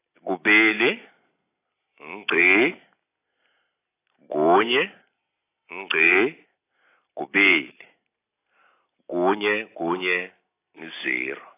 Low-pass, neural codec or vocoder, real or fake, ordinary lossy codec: 3.6 kHz; none; real; AAC, 24 kbps